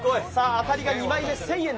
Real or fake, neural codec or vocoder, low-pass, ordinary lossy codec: real; none; none; none